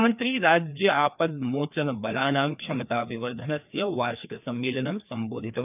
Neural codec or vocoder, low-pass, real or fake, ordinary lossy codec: codec, 16 kHz, 2 kbps, FreqCodec, larger model; 3.6 kHz; fake; none